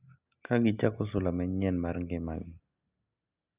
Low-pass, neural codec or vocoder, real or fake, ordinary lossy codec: 3.6 kHz; none; real; Opus, 64 kbps